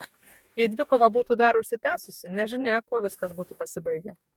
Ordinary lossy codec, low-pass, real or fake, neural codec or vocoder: MP3, 96 kbps; 19.8 kHz; fake; codec, 44.1 kHz, 2.6 kbps, DAC